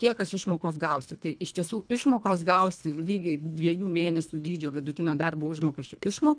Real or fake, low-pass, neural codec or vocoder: fake; 9.9 kHz; codec, 24 kHz, 1.5 kbps, HILCodec